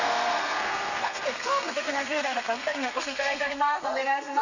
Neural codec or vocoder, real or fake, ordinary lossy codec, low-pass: codec, 32 kHz, 1.9 kbps, SNAC; fake; none; 7.2 kHz